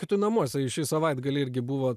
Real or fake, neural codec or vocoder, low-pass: real; none; 14.4 kHz